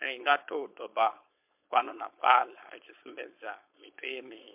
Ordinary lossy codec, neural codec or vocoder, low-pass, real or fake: MP3, 32 kbps; codec, 16 kHz, 4.8 kbps, FACodec; 3.6 kHz; fake